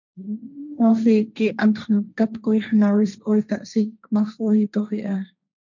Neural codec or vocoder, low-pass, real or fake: codec, 16 kHz, 1.1 kbps, Voila-Tokenizer; 7.2 kHz; fake